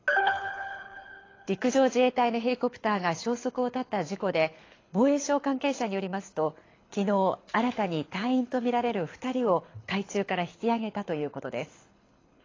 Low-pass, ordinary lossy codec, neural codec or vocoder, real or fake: 7.2 kHz; AAC, 32 kbps; codec, 24 kHz, 6 kbps, HILCodec; fake